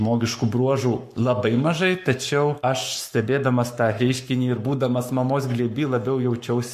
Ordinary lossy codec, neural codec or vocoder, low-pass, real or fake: MP3, 64 kbps; codec, 44.1 kHz, 7.8 kbps, Pupu-Codec; 14.4 kHz; fake